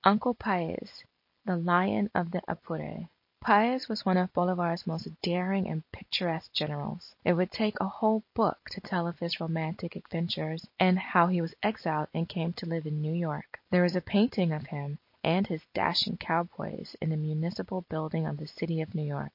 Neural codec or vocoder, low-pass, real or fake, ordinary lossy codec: none; 5.4 kHz; real; MP3, 32 kbps